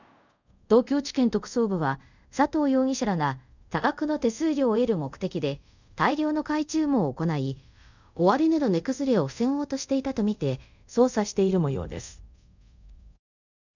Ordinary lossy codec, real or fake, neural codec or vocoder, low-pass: none; fake; codec, 24 kHz, 0.5 kbps, DualCodec; 7.2 kHz